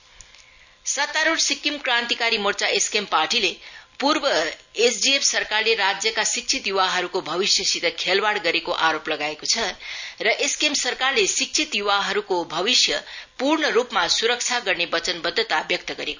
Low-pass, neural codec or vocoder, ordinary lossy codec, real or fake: 7.2 kHz; none; none; real